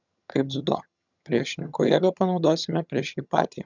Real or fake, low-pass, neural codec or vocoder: fake; 7.2 kHz; vocoder, 22.05 kHz, 80 mel bands, HiFi-GAN